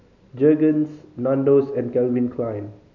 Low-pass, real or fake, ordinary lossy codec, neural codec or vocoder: 7.2 kHz; real; none; none